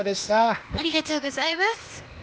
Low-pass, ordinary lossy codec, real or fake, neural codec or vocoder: none; none; fake; codec, 16 kHz, 0.8 kbps, ZipCodec